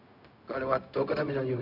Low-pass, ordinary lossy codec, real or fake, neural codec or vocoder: 5.4 kHz; none; fake; codec, 16 kHz, 0.4 kbps, LongCat-Audio-Codec